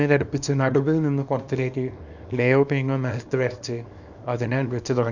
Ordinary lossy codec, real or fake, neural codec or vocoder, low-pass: none; fake; codec, 24 kHz, 0.9 kbps, WavTokenizer, small release; 7.2 kHz